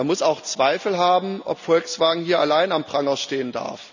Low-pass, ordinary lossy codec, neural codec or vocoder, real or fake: 7.2 kHz; none; none; real